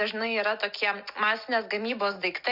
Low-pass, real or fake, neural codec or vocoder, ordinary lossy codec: 5.4 kHz; real; none; Opus, 64 kbps